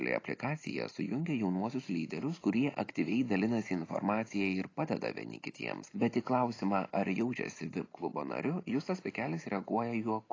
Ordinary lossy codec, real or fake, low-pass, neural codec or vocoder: AAC, 32 kbps; real; 7.2 kHz; none